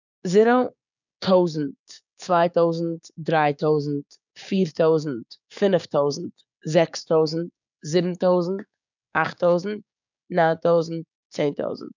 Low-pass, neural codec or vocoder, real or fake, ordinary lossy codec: 7.2 kHz; codec, 16 kHz, 6 kbps, DAC; fake; none